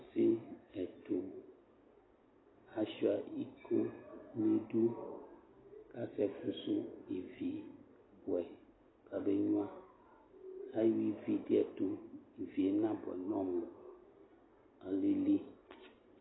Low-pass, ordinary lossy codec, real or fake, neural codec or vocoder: 7.2 kHz; AAC, 16 kbps; real; none